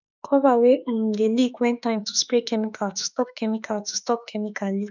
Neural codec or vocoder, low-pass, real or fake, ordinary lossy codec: autoencoder, 48 kHz, 32 numbers a frame, DAC-VAE, trained on Japanese speech; 7.2 kHz; fake; none